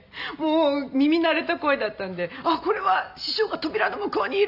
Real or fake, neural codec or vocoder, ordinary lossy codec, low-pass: real; none; none; 5.4 kHz